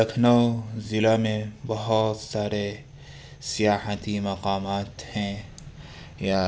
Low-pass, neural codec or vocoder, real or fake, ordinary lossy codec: none; none; real; none